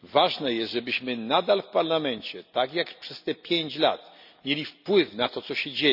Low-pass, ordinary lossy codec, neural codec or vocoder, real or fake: 5.4 kHz; none; none; real